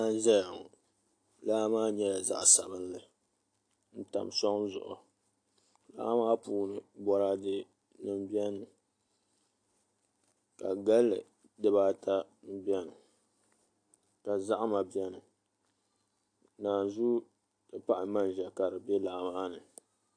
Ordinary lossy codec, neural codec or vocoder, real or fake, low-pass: AAC, 48 kbps; none; real; 9.9 kHz